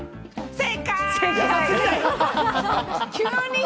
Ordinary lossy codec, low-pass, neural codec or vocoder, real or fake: none; none; none; real